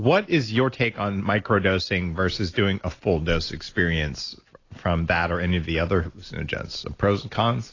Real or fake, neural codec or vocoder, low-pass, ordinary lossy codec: real; none; 7.2 kHz; AAC, 32 kbps